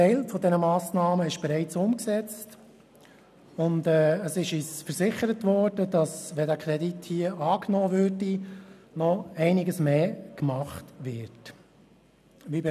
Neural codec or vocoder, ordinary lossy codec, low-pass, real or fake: none; none; 14.4 kHz; real